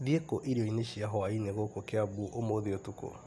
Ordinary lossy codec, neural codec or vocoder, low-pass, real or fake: none; none; none; real